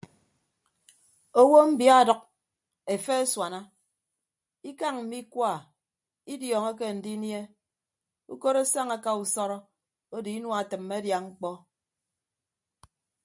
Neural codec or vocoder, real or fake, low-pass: none; real; 10.8 kHz